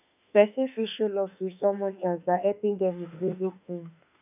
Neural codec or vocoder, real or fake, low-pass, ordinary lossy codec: autoencoder, 48 kHz, 32 numbers a frame, DAC-VAE, trained on Japanese speech; fake; 3.6 kHz; none